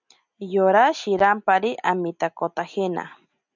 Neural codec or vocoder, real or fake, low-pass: none; real; 7.2 kHz